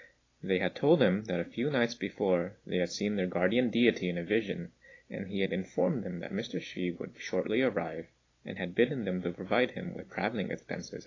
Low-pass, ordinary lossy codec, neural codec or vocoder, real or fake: 7.2 kHz; AAC, 32 kbps; none; real